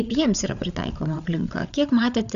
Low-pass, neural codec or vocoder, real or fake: 7.2 kHz; codec, 16 kHz, 8 kbps, FreqCodec, larger model; fake